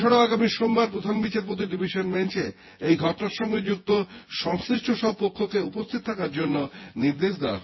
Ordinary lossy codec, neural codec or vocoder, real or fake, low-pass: MP3, 24 kbps; vocoder, 24 kHz, 100 mel bands, Vocos; fake; 7.2 kHz